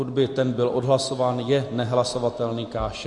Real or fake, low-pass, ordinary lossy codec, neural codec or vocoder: real; 10.8 kHz; MP3, 48 kbps; none